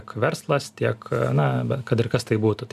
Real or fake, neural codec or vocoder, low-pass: real; none; 14.4 kHz